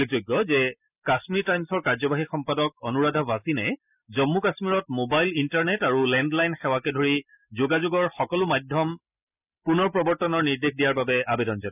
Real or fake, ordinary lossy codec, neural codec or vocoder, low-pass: real; none; none; 3.6 kHz